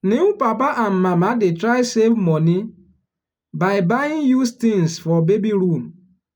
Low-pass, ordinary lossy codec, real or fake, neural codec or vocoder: 19.8 kHz; none; real; none